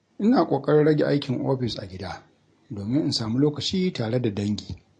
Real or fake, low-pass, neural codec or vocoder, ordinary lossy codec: real; 9.9 kHz; none; MP3, 48 kbps